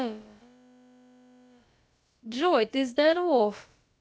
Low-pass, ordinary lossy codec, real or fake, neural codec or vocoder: none; none; fake; codec, 16 kHz, about 1 kbps, DyCAST, with the encoder's durations